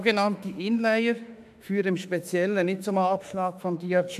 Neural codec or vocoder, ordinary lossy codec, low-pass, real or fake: autoencoder, 48 kHz, 32 numbers a frame, DAC-VAE, trained on Japanese speech; none; 14.4 kHz; fake